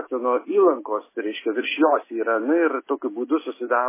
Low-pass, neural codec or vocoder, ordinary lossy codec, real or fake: 3.6 kHz; none; MP3, 16 kbps; real